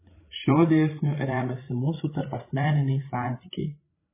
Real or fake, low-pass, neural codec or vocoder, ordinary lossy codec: fake; 3.6 kHz; codec, 16 kHz, 16 kbps, FreqCodec, larger model; MP3, 16 kbps